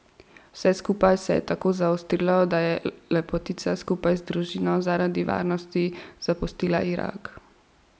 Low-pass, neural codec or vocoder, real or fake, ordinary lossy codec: none; none; real; none